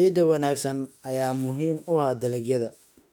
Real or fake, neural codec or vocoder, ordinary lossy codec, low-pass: fake; autoencoder, 48 kHz, 32 numbers a frame, DAC-VAE, trained on Japanese speech; none; 19.8 kHz